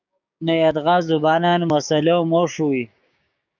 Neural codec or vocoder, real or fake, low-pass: codec, 16 kHz, 6 kbps, DAC; fake; 7.2 kHz